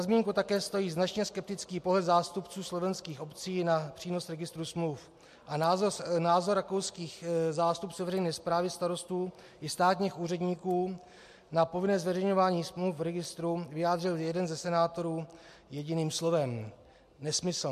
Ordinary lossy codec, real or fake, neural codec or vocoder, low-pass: MP3, 64 kbps; real; none; 14.4 kHz